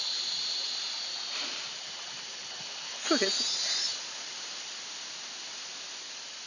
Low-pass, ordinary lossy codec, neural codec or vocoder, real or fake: 7.2 kHz; none; vocoder, 44.1 kHz, 128 mel bands every 256 samples, BigVGAN v2; fake